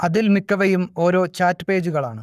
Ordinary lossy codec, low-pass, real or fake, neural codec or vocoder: none; 14.4 kHz; fake; codec, 44.1 kHz, 7.8 kbps, Pupu-Codec